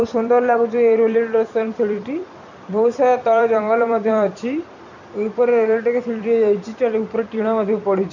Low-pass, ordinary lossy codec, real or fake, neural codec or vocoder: 7.2 kHz; none; fake; vocoder, 44.1 kHz, 128 mel bands every 256 samples, BigVGAN v2